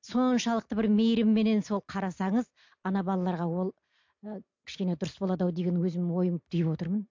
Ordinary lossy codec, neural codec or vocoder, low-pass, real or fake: MP3, 48 kbps; none; 7.2 kHz; real